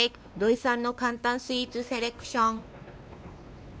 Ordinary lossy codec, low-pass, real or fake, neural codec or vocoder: none; none; fake; codec, 16 kHz, 2 kbps, X-Codec, WavLM features, trained on Multilingual LibriSpeech